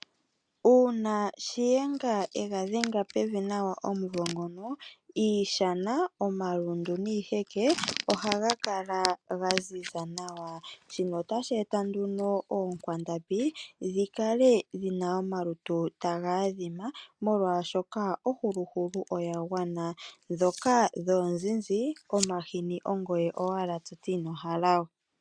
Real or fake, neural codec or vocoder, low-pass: real; none; 9.9 kHz